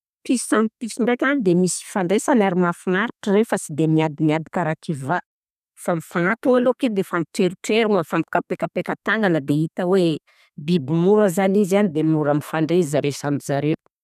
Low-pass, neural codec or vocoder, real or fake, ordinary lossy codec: 14.4 kHz; codec, 32 kHz, 1.9 kbps, SNAC; fake; none